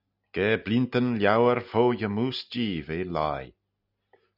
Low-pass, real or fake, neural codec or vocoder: 5.4 kHz; real; none